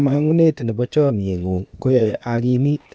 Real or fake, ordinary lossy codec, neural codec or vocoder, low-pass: fake; none; codec, 16 kHz, 0.8 kbps, ZipCodec; none